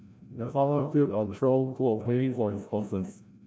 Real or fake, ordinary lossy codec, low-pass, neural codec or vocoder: fake; none; none; codec, 16 kHz, 0.5 kbps, FreqCodec, larger model